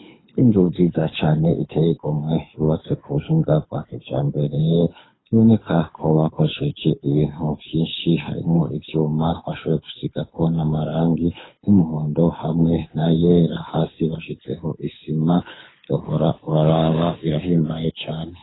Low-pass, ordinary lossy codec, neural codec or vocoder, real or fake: 7.2 kHz; AAC, 16 kbps; codec, 24 kHz, 6 kbps, HILCodec; fake